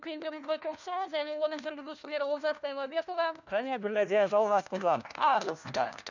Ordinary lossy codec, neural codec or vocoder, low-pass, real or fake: none; codec, 16 kHz, 1 kbps, FunCodec, trained on LibriTTS, 50 frames a second; 7.2 kHz; fake